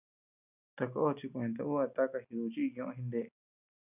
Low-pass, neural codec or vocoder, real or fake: 3.6 kHz; none; real